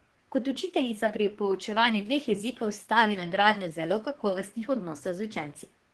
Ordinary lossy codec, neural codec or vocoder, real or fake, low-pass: Opus, 16 kbps; codec, 24 kHz, 1 kbps, SNAC; fake; 10.8 kHz